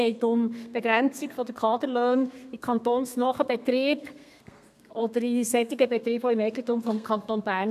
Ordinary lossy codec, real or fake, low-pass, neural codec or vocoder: none; fake; 14.4 kHz; codec, 32 kHz, 1.9 kbps, SNAC